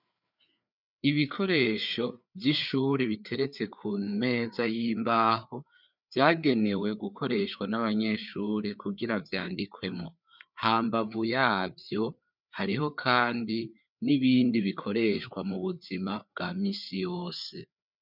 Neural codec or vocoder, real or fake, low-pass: codec, 16 kHz, 4 kbps, FreqCodec, larger model; fake; 5.4 kHz